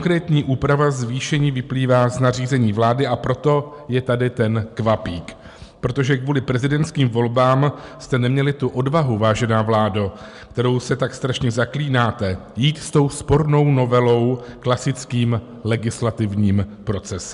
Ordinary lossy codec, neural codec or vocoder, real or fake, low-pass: MP3, 96 kbps; none; real; 10.8 kHz